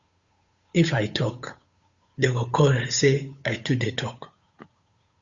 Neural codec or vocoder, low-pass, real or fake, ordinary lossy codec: codec, 16 kHz, 8 kbps, FunCodec, trained on Chinese and English, 25 frames a second; 7.2 kHz; fake; Opus, 64 kbps